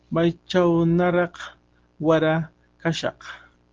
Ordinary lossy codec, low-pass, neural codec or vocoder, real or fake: Opus, 16 kbps; 7.2 kHz; none; real